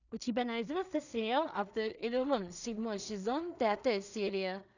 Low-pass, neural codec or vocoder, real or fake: 7.2 kHz; codec, 16 kHz in and 24 kHz out, 0.4 kbps, LongCat-Audio-Codec, two codebook decoder; fake